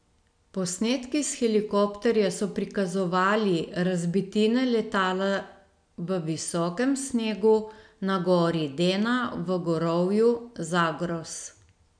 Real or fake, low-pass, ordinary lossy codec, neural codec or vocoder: real; 9.9 kHz; none; none